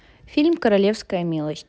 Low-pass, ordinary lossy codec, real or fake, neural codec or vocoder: none; none; real; none